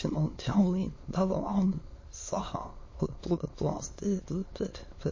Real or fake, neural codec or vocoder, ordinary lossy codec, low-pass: fake; autoencoder, 22.05 kHz, a latent of 192 numbers a frame, VITS, trained on many speakers; MP3, 32 kbps; 7.2 kHz